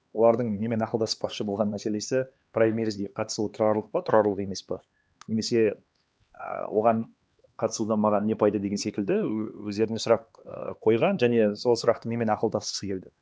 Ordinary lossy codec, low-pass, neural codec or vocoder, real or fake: none; none; codec, 16 kHz, 2 kbps, X-Codec, HuBERT features, trained on LibriSpeech; fake